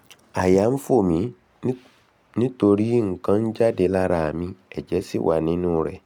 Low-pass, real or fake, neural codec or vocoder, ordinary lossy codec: 19.8 kHz; real; none; none